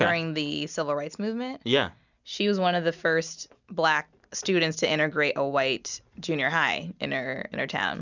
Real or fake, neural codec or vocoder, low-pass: real; none; 7.2 kHz